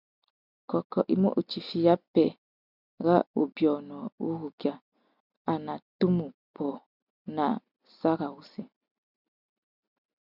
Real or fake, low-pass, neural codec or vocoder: real; 5.4 kHz; none